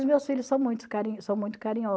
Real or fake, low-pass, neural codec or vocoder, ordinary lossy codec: real; none; none; none